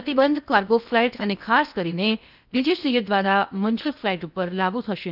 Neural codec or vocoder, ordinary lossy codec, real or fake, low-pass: codec, 16 kHz in and 24 kHz out, 0.8 kbps, FocalCodec, streaming, 65536 codes; none; fake; 5.4 kHz